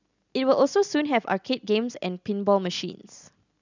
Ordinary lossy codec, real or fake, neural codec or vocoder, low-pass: none; real; none; 7.2 kHz